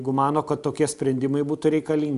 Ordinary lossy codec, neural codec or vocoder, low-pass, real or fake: Opus, 64 kbps; none; 10.8 kHz; real